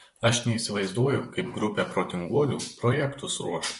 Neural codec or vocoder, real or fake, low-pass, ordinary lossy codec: vocoder, 44.1 kHz, 128 mel bands, Pupu-Vocoder; fake; 14.4 kHz; MP3, 48 kbps